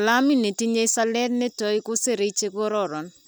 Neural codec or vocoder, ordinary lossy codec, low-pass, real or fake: none; none; none; real